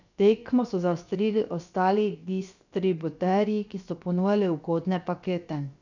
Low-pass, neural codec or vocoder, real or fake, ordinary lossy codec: 7.2 kHz; codec, 16 kHz, about 1 kbps, DyCAST, with the encoder's durations; fake; none